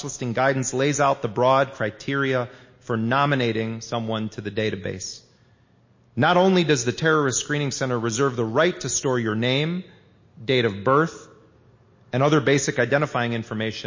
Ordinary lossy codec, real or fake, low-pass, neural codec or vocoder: MP3, 32 kbps; real; 7.2 kHz; none